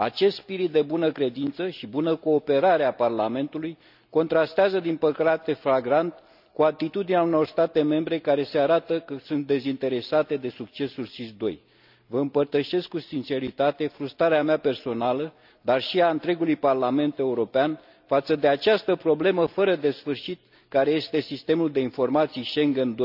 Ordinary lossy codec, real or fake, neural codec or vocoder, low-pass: none; real; none; 5.4 kHz